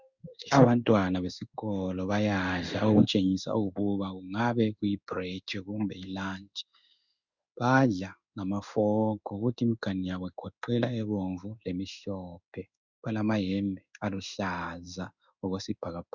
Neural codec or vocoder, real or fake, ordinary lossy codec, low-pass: codec, 16 kHz in and 24 kHz out, 1 kbps, XY-Tokenizer; fake; Opus, 64 kbps; 7.2 kHz